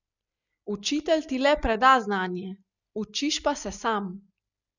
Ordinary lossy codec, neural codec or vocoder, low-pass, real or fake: none; none; 7.2 kHz; real